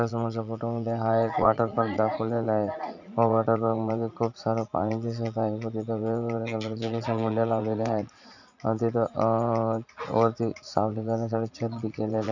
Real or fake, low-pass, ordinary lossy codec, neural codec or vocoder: fake; 7.2 kHz; none; vocoder, 44.1 kHz, 80 mel bands, Vocos